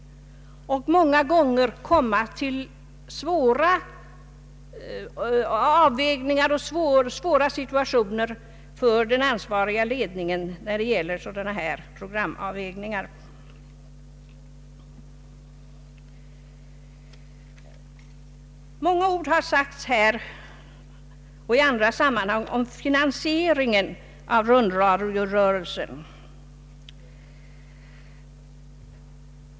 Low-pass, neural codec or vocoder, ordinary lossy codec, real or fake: none; none; none; real